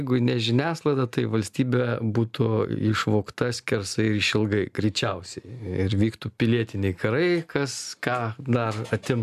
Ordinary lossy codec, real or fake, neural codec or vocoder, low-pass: AAC, 96 kbps; real; none; 14.4 kHz